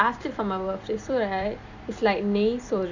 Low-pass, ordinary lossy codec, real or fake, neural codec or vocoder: 7.2 kHz; none; fake; vocoder, 44.1 kHz, 128 mel bands every 256 samples, BigVGAN v2